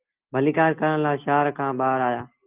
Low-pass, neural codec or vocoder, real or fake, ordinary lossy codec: 3.6 kHz; none; real; Opus, 32 kbps